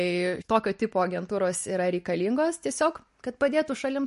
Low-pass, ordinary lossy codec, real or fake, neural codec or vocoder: 14.4 kHz; MP3, 48 kbps; real; none